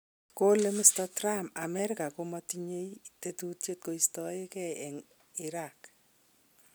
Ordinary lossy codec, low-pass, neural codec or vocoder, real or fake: none; none; none; real